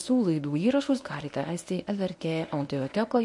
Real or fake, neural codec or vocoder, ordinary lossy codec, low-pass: fake; codec, 24 kHz, 0.9 kbps, WavTokenizer, medium speech release version 1; MP3, 48 kbps; 10.8 kHz